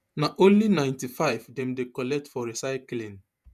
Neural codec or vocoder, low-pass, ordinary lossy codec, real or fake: vocoder, 48 kHz, 128 mel bands, Vocos; 14.4 kHz; none; fake